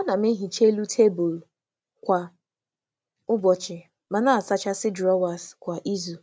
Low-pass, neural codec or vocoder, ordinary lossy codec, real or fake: none; none; none; real